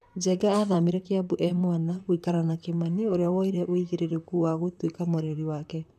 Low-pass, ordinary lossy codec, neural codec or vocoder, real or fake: 14.4 kHz; none; vocoder, 44.1 kHz, 128 mel bands, Pupu-Vocoder; fake